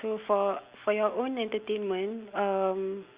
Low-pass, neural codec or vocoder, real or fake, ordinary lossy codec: 3.6 kHz; none; real; Opus, 64 kbps